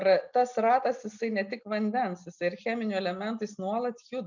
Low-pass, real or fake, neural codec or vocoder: 7.2 kHz; real; none